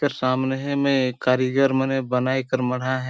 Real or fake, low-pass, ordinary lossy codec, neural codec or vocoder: real; none; none; none